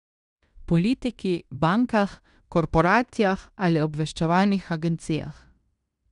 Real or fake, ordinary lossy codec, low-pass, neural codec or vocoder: fake; none; 10.8 kHz; codec, 16 kHz in and 24 kHz out, 0.9 kbps, LongCat-Audio-Codec, fine tuned four codebook decoder